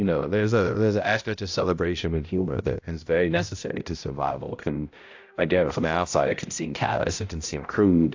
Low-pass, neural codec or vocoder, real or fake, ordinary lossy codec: 7.2 kHz; codec, 16 kHz, 0.5 kbps, X-Codec, HuBERT features, trained on balanced general audio; fake; AAC, 48 kbps